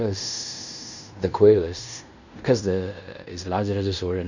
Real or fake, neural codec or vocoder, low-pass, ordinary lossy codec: fake; codec, 16 kHz in and 24 kHz out, 0.9 kbps, LongCat-Audio-Codec, fine tuned four codebook decoder; 7.2 kHz; none